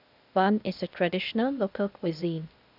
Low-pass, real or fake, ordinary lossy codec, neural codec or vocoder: 5.4 kHz; fake; none; codec, 16 kHz, 0.8 kbps, ZipCodec